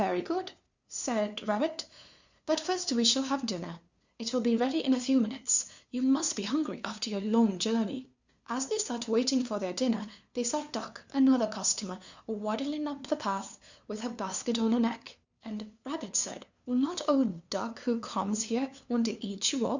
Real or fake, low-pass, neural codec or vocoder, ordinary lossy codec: fake; 7.2 kHz; codec, 16 kHz, 2 kbps, FunCodec, trained on LibriTTS, 25 frames a second; Opus, 64 kbps